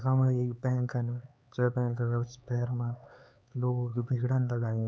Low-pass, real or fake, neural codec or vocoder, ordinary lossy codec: none; fake; codec, 16 kHz, 4 kbps, X-Codec, HuBERT features, trained on LibriSpeech; none